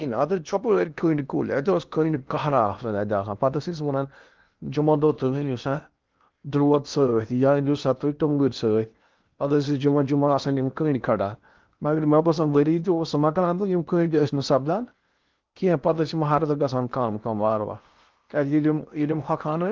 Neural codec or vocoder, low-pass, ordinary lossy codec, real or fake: codec, 16 kHz in and 24 kHz out, 0.8 kbps, FocalCodec, streaming, 65536 codes; 7.2 kHz; Opus, 32 kbps; fake